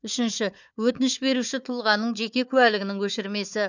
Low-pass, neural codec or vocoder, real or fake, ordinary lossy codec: 7.2 kHz; codec, 16 kHz, 4 kbps, FunCodec, trained on Chinese and English, 50 frames a second; fake; none